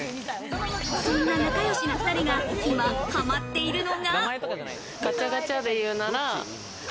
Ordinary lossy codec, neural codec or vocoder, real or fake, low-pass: none; none; real; none